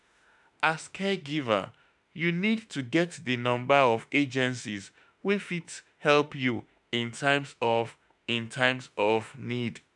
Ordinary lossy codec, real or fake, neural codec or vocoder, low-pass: none; fake; autoencoder, 48 kHz, 32 numbers a frame, DAC-VAE, trained on Japanese speech; 10.8 kHz